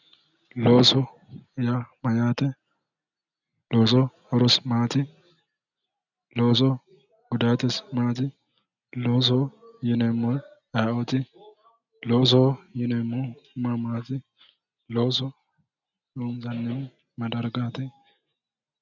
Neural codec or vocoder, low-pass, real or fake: none; 7.2 kHz; real